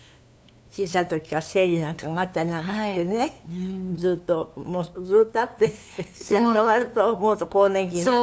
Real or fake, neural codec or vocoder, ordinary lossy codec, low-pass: fake; codec, 16 kHz, 2 kbps, FunCodec, trained on LibriTTS, 25 frames a second; none; none